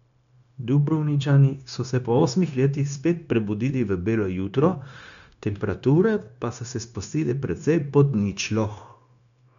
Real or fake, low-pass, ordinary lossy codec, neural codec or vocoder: fake; 7.2 kHz; none; codec, 16 kHz, 0.9 kbps, LongCat-Audio-Codec